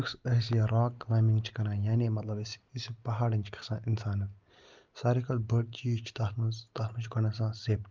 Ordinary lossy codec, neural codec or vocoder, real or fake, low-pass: Opus, 32 kbps; none; real; 7.2 kHz